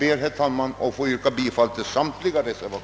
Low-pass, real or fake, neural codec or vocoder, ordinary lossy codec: none; real; none; none